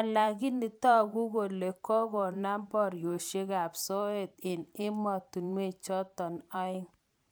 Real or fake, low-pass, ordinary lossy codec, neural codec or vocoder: fake; none; none; vocoder, 44.1 kHz, 128 mel bands every 512 samples, BigVGAN v2